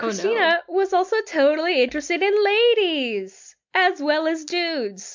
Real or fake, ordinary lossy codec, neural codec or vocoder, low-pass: real; MP3, 64 kbps; none; 7.2 kHz